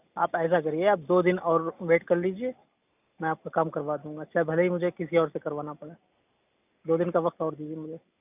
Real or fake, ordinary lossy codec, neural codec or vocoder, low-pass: real; none; none; 3.6 kHz